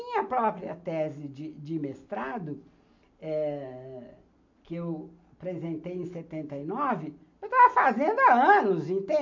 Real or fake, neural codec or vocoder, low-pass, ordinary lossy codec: real; none; 7.2 kHz; MP3, 48 kbps